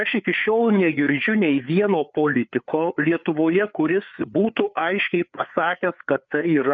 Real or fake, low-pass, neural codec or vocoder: fake; 7.2 kHz; codec, 16 kHz, 4 kbps, FreqCodec, larger model